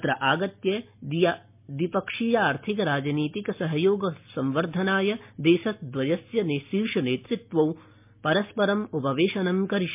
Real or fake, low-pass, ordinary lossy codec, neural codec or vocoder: real; 3.6 kHz; MP3, 32 kbps; none